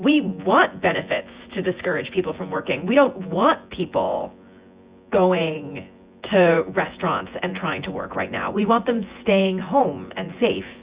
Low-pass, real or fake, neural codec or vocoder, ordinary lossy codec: 3.6 kHz; fake; vocoder, 24 kHz, 100 mel bands, Vocos; Opus, 64 kbps